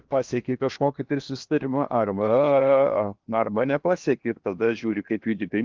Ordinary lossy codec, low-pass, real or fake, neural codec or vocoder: Opus, 32 kbps; 7.2 kHz; fake; codec, 16 kHz in and 24 kHz out, 0.8 kbps, FocalCodec, streaming, 65536 codes